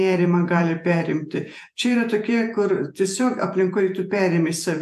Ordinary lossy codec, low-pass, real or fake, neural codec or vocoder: AAC, 96 kbps; 14.4 kHz; fake; vocoder, 48 kHz, 128 mel bands, Vocos